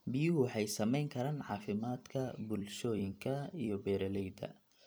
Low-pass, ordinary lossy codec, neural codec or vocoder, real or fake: none; none; vocoder, 44.1 kHz, 128 mel bands every 256 samples, BigVGAN v2; fake